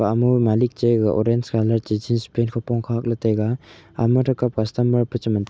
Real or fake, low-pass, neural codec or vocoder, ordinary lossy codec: real; none; none; none